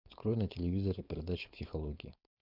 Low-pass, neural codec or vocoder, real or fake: 5.4 kHz; codec, 16 kHz, 4.8 kbps, FACodec; fake